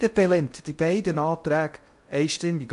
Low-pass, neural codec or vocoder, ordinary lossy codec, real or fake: 10.8 kHz; codec, 16 kHz in and 24 kHz out, 0.6 kbps, FocalCodec, streaming, 4096 codes; MP3, 64 kbps; fake